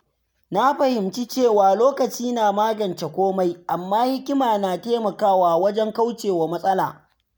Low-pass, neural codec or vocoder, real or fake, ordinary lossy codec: none; none; real; none